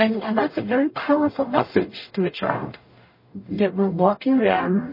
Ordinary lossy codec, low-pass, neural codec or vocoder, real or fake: MP3, 24 kbps; 5.4 kHz; codec, 44.1 kHz, 0.9 kbps, DAC; fake